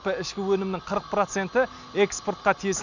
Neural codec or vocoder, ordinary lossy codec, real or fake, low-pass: none; none; real; 7.2 kHz